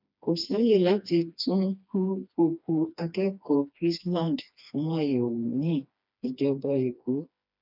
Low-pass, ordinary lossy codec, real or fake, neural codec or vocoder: 5.4 kHz; none; fake; codec, 16 kHz, 2 kbps, FreqCodec, smaller model